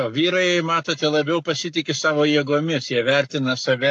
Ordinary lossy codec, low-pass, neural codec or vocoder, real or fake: AAC, 64 kbps; 10.8 kHz; codec, 44.1 kHz, 7.8 kbps, Pupu-Codec; fake